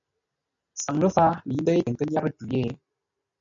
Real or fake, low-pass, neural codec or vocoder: real; 7.2 kHz; none